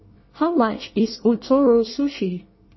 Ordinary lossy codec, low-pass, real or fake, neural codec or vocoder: MP3, 24 kbps; 7.2 kHz; fake; codec, 24 kHz, 1 kbps, SNAC